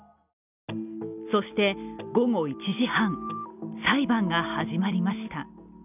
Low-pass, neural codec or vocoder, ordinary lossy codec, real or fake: 3.6 kHz; none; none; real